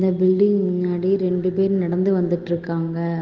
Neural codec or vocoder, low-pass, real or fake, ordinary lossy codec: none; 7.2 kHz; real; Opus, 16 kbps